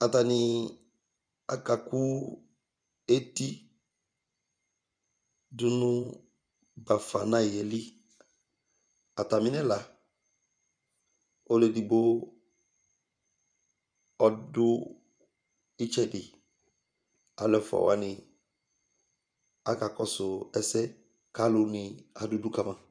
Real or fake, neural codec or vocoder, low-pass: fake; vocoder, 24 kHz, 100 mel bands, Vocos; 9.9 kHz